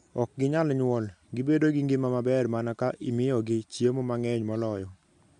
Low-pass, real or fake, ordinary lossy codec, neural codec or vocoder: 10.8 kHz; real; MP3, 64 kbps; none